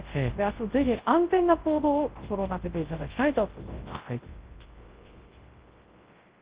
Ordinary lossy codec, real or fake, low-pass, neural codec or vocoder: Opus, 16 kbps; fake; 3.6 kHz; codec, 24 kHz, 0.9 kbps, WavTokenizer, large speech release